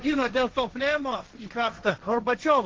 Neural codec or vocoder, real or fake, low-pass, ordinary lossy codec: codec, 16 kHz, 1.1 kbps, Voila-Tokenizer; fake; 7.2 kHz; Opus, 16 kbps